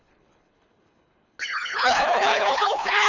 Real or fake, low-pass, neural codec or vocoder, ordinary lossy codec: fake; 7.2 kHz; codec, 24 kHz, 3 kbps, HILCodec; none